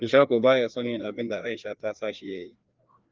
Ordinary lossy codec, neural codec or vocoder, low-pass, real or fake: Opus, 24 kbps; codec, 16 kHz, 2 kbps, FreqCodec, larger model; 7.2 kHz; fake